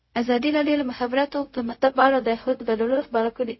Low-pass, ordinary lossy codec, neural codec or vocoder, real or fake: 7.2 kHz; MP3, 24 kbps; codec, 16 kHz, 0.4 kbps, LongCat-Audio-Codec; fake